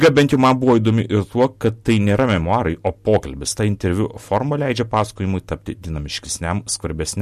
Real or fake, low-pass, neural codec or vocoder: real; 14.4 kHz; none